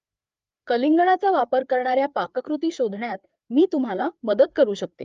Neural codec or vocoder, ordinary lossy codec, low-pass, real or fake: codec, 16 kHz, 4 kbps, FreqCodec, larger model; Opus, 32 kbps; 7.2 kHz; fake